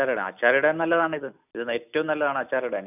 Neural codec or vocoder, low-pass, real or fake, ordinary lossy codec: none; 3.6 kHz; real; none